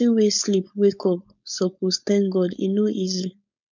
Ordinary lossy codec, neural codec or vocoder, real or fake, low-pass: none; codec, 16 kHz, 4.8 kbps, FACodec; fake; 7.2 kHz